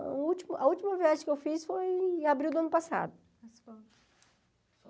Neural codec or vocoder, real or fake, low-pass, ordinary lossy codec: none; real; none; none